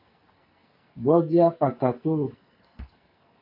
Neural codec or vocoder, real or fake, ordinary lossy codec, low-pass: codec, 44.1 kHz, 2.6 kbps, SNAC; fake; MP3, 32 kbps; 5.4 kHz